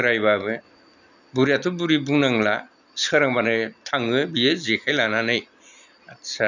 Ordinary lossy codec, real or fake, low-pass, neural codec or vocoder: none; real; 7.2 kHz; none